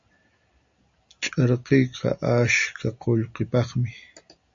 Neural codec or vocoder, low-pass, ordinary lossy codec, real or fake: none; 7.2 kHz; AAC, 64 kbps; real